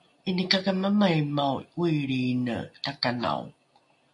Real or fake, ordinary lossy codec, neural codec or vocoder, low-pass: real; MP3, 48 kbps; none; 10.8 kHz